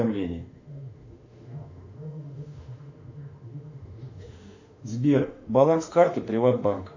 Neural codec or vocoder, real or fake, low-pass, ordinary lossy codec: autoencoder, 48 kHz, 32 numbers a frame, DAC-VAE, trained on Japanese speech; fake; 7.2 kHz; AAC, 48 kbps